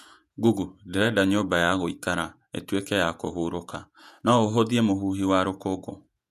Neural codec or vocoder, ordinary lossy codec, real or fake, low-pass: vocoder, 48 kHz, 128 mel bands, Vocos; none; fake; 14.4 kHz